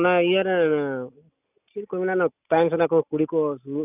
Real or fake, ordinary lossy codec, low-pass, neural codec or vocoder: real; none; 3.6 kHz; none